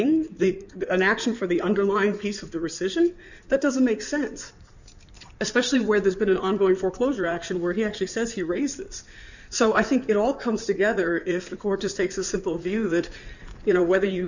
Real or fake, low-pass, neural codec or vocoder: fake; 7.2 kHz; codec, 16 kHz in and 24 kHz out, 2.2 kbps, FireRedTTS-2 codec